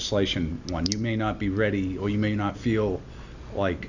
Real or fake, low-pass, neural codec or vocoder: real; 7.2 kHz; none